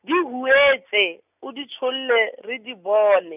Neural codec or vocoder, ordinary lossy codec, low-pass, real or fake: none; none; 3.6 kHz; real